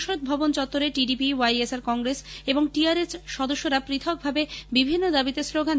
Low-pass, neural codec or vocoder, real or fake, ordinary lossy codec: none; none; real; none